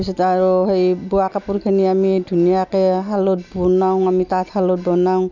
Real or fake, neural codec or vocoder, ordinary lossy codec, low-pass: real; none; none; 7.2 kHz